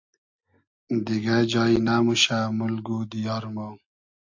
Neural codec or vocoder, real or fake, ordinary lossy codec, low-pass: none; real; AAC, 48 kbps; 7.2 kHz